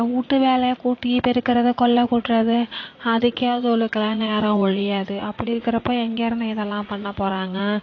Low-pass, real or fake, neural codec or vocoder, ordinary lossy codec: 7.2 kHz; fake; vocoder, 44.1 kHz, 80 mel bands, Vocos; AAC, 32 kbps